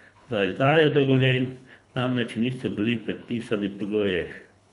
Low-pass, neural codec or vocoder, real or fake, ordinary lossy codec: 10.8 kHz; codec, 24 kHz, 3 kbps, HILCodec; fake; none